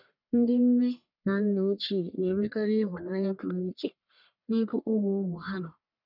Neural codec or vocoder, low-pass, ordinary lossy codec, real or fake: codec, 44.1 kHz, 1.7 kbps, Pupu-Codec; 5.4 kHz; none; fake